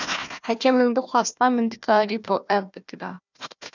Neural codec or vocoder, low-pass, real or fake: codec, 16 kHz, 1 kbps, FunCodec, trained on Chinese and English, 50 frames a second; 7.2 kHz; fake